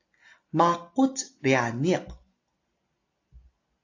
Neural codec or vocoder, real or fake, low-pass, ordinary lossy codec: none; real; 7.2 kHz; AAC, 48 kbps